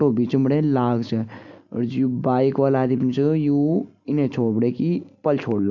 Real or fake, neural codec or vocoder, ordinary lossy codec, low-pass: real; none; none; 7.2 kHz